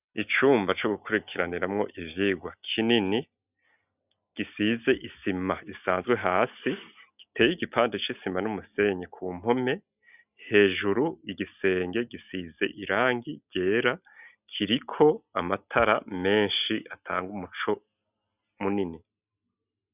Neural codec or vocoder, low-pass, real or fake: none; 3.6 kHz; real